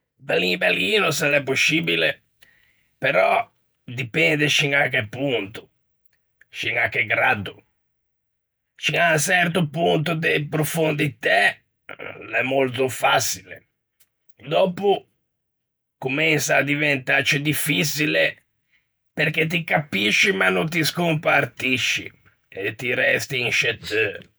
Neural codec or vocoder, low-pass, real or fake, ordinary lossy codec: vocoder, 48 kHz, 128 mel bands, Vocos; none; fake; none